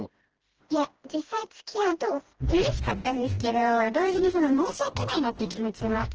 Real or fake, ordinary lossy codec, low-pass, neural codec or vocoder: fake; Opus, 16 kbps; 7.2 kHz; codec, 16 kHz, 1 kbps, FreqCodec, smaller model